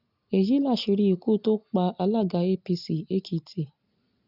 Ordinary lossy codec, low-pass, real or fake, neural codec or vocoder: none; 5.4 kHz; real; none